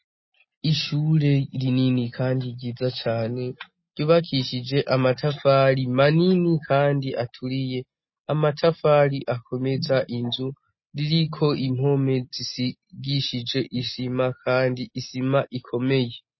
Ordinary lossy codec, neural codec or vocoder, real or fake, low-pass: MP3, 24 kbps; none; real; 7.2 kHz